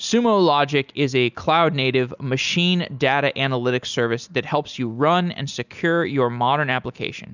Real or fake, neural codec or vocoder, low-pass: real; none; 7.2 kHz